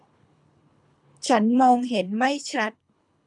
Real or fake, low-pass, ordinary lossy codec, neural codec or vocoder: fake; 10.8 kHz; none; codec, 24 kHz, 3 kbps, HILCodec